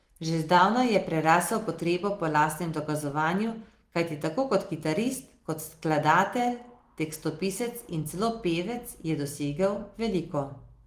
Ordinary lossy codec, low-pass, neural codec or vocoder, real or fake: Opus, 24 kbps; 14.4 kHz; none; real